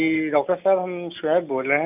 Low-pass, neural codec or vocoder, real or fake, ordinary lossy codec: 3.6 kHz; none; real; none